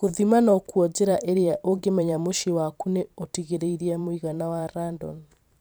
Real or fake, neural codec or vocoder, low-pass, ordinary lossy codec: fake; vocoder, 44.1 kHz, 128 mel bands every 512 samples, BigVGAN v2; none; none